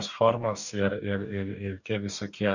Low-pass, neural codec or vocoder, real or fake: 7.2 kHz; codec, 44.1 kHz, 2.6 kbps, DAC; fake